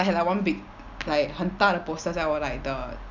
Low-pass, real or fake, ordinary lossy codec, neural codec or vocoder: 7.2 kHz; real; none; none